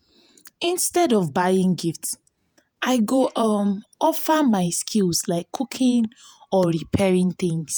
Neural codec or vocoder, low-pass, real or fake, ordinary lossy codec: vocoder, 48 kHz, 128 mel bands, Vocos; none; fake; none